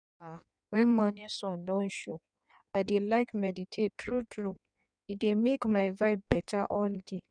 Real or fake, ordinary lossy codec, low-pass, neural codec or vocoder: fake; none; 9.9 kHz; codec, 16 kHz in and 24 kHz out, 1.1 kbps, FireRedTTS-2 codec